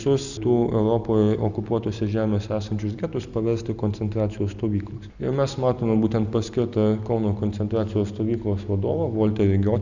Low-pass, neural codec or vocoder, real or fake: 7.2 kHz; none; real